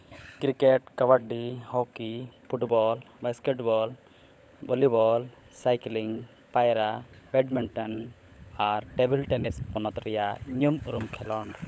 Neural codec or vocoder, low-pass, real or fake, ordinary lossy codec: codec, 16 kHz, 16 kbps, FunCodec, trained on LibriTTS, 50 frames a second; none; fake; none